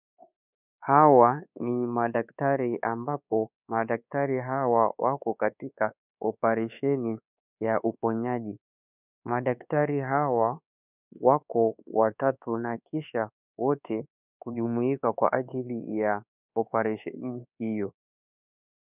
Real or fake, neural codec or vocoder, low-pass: fake; codec, 24 kHz, 1.2 kbps, DualCodec; 3.6 kHz